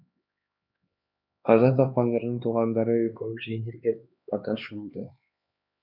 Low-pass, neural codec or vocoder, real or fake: 5.4 kHz; codec, 16 kHz, 2 kbps, X-Codec, HuBERT features, trained on LibriSpeech; fake